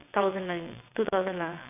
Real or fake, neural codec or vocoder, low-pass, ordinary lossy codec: fake; vocoder, 22.05 kHz, 80 mel bands, WaveNeXt; 3.6 kHz; none